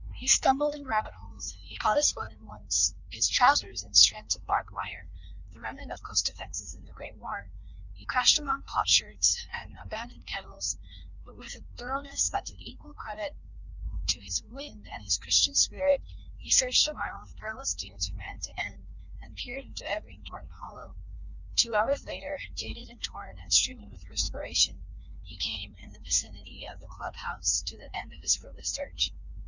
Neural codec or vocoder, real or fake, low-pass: codec, 16 kHz in and 24 kHz out, 1.1 kbps, FireRedTTS-2 codec; fake; 7.2 kHz